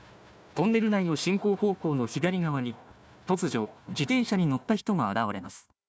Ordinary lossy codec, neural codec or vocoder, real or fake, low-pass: none; codec, 16 kHz, 1 kbps, FunCodec, trained on Chinese and English, 50 frames a second; fake; none